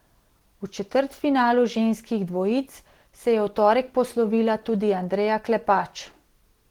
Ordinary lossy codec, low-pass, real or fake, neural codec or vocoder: Opus, 16 kbps; 19.8 kHz; real; none